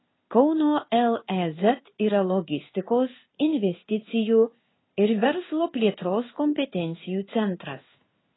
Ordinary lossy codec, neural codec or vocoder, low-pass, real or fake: AAC, 16 kbps; codec, 16 kHz in and 24 kHz out, 1 kbps, XY-Tokenizer; 7.2 kHz; fake